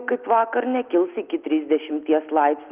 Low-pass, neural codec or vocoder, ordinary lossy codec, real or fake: 3.6 kHz; none; Opus, 32 kbps; real